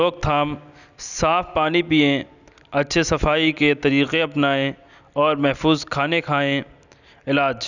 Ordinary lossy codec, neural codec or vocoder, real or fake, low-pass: none; none; real; 7.2 kHz